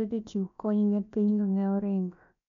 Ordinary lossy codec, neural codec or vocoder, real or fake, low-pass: none; codec, 16 kHz, about 1 kbps, DyCAST, with the encoder's durations; fake; 7.2 kHz